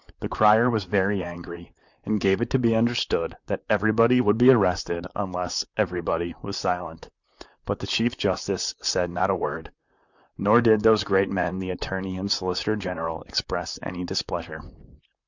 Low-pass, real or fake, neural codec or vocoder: 7.2 kHz; fake; vocoder, 44.1 kHz, 128 mel bands, Pupu-Vocoder